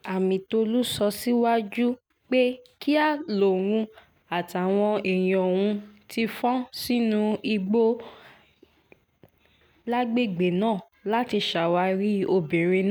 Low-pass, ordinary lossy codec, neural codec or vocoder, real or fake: none; none; none; real